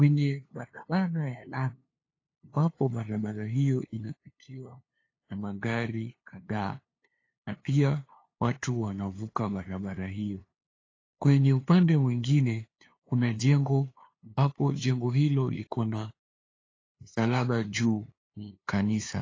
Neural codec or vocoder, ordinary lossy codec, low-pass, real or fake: codec, 16 kHz, 2 kbps, FunCodec, trained on LibriTTS, 25 frames a second; AAC, 32 kbps; 7.2 kHz; fake